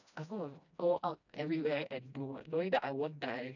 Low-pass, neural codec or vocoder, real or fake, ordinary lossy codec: 7.2 kHz; codec, 16 kHz, 1 kbps, FreqCodec, smaller model; fake; none